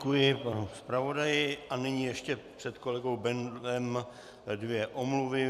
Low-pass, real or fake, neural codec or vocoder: 14.4 kHz; fake; vocoder, 44.1 kHz, 128 mel bands every 256 samples, BigVGAN v2